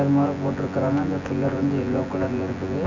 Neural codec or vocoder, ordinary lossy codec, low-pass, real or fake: vocoder, 24 kHz, 100 mel bands, Vocos; none; 7.2 kHz; fake